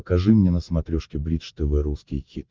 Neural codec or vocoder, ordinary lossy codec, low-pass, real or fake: none; Opus, 32 kbps; 7.2 kHz; real